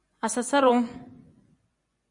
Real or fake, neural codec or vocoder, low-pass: fake; vocoder, 24 kHz, 100 mel bands, Vocos; 10.8 kHz